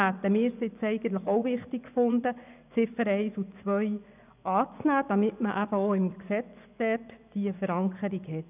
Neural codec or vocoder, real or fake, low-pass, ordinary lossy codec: vocoder, 24 kHz, 100 mel bands, Vocos; fake; 3.6 kHz; none